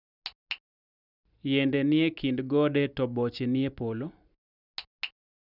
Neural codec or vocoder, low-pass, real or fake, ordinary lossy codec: none; 5.4 kHz; real; none